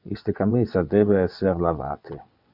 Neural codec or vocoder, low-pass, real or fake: vocoder, 22.05 kHz, 80 mel bands, WaveNeXt; 5.4 kHz; fake